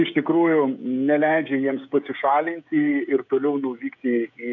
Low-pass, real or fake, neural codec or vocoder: 7.2 kHz; fake; codec, 16 kHz, 16 kbps, FreqCodec, smaller model